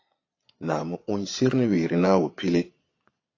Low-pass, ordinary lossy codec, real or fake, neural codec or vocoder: 7.2 kHz; AAC, 32 kbps; fake; vocoder, 22.05 kHz, 80 mel bands, Vocos